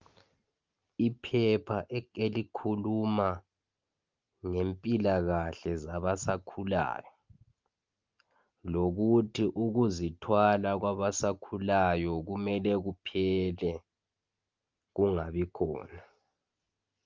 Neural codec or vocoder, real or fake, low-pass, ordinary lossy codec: none; real; 7.2 kHz; Opus, 24 kbps